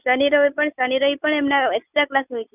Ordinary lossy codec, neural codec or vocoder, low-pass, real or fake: none; none; 3.6 kHz; real